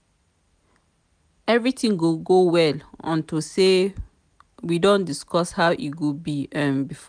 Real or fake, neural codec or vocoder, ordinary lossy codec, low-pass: real; none; none; 9.9 kHz